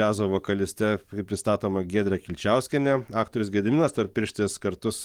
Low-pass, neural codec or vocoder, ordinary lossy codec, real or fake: 19.8 kHz; codec, 44.1 kHz, 7.8 kbps, DAC; Opus, 24 kbps; fake